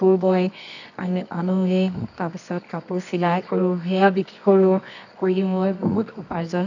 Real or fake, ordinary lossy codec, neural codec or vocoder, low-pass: fake; none; codec, 24 kHz, 0.9 kbps, WavTokenizer, medium music audio release; 7.2 kHz